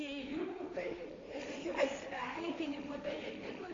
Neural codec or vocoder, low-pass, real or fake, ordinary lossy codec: codec, 16 kHz, 1.1 kbps, Voila-Tokenizer; 7.2 kHz; fake; AAC, 32 kbps